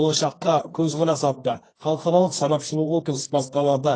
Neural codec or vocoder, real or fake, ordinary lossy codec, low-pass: codec, 24 kHz, 0.9 kbps, WavTokenizer, medium music audio release; fake; AAC, 32 kbps; 9.9 kHz